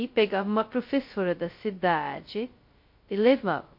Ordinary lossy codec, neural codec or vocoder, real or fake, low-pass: MP3, 32 kbps; codec, 16 kHz, 0.2 kbps, FocalCodec; fake; 5.4 kHz